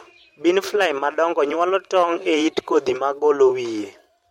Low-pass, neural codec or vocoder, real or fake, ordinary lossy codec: 19.8 kHz; vocoder, 44.1 kHz, 128 mel bands every 512 samples, BigVGAN v2; fake; MP3, 64 kbps